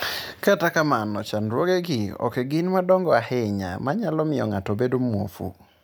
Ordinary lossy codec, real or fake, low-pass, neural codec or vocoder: none; real; none; none